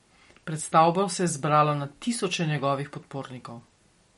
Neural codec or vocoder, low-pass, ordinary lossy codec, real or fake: none; 19.8 kHz; MP3, 48 kbps; real